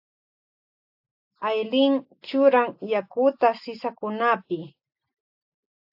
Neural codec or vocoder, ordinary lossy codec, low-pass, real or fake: none; AAC, 48 kbps; 5.4 kHz; real